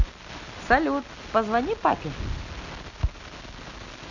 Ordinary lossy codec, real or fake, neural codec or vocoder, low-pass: none; real; none; 7.2 kHz